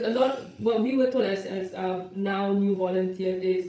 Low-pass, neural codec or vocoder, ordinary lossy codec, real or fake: none; codec, 16 kHz, 8 kbps, FreqCodec, larger model; none; fake